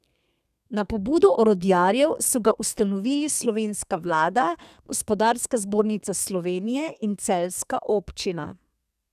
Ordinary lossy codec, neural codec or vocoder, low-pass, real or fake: none; codec, 32 kHz, 1.9 kbps, SNAC; 14.4 kHz; fake